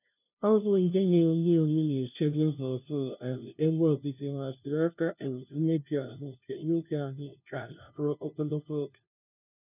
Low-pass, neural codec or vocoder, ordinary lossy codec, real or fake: 3.6 kHz; codec, 16 kHz, 0.5 kbps, FunCodec, trained on LibriTTS, 25 frames a second; none; fake